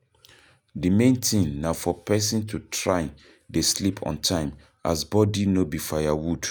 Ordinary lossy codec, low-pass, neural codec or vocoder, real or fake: none; none; none; real